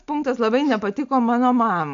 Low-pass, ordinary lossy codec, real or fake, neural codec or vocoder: 7.2 kHz; AAC, 64 kbps; real; none